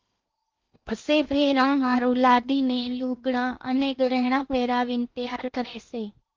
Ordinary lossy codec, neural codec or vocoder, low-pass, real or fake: Opus, 32 kbps; codec, 16 kHz in and 24 kHz out, 0.8 kbps, FocalCodec, streaming, 65536 codes; 7.2 kHz; fake